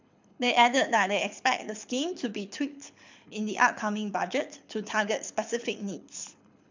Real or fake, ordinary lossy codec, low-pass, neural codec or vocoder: fake; MP3, 64 kbps; 7.2 kHz; codec, 24 kHz, 6 kbps, HILCodec